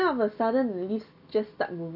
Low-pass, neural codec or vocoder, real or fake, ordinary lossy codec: 5.4 kHz; none; real; none